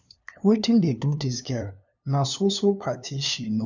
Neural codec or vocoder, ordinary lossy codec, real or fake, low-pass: codec, 16 kHz, 2 kbps, FunCodec, trained on LibriTTS, 25 frames a second; none; fake; 7.2 kHz